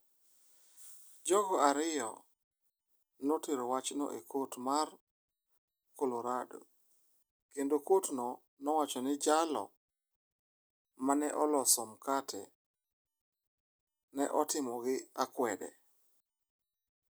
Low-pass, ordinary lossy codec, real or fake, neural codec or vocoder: none; none; real; none